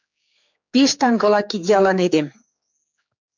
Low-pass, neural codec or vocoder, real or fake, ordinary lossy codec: 7.2 kHz; codec, 16 kHz, 4 kbps, X-Codec, HuBERT features, trained on general audio; fake; MP3, 64 kbps